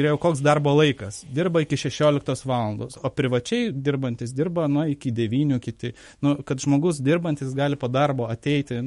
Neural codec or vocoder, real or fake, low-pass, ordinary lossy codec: autoencoder, 48 kHz, 128 numbers a frame, DAC-VAE, trained on Japanese speech; fake; 19.8 kHz; MP3, 48 kbps